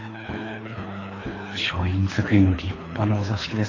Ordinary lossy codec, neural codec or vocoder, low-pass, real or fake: AAC, 32 kbps; codec, 24 kHz, 3 kbps, HILCodec; 7.2 kHz; fake